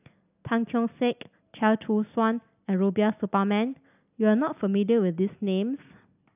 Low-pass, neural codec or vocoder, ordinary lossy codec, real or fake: 3.6 kHz; none; AAC, 32 kbps; real